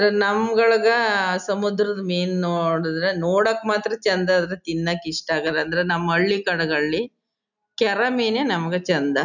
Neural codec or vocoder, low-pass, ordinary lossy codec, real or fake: none; 7.2 kHz; none; real